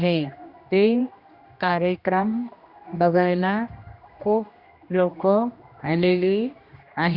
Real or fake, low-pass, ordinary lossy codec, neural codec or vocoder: fake; 5.4 kHz; Opus, 64 kbps; codec, 16 kHz, 1 kbps, X-Codec, HuBERT features, trained on general audio